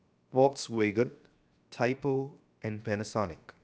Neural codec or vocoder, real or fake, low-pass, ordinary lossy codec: codec, 16 kHz, 0.7 kbps, FocalCodec; fake; none; none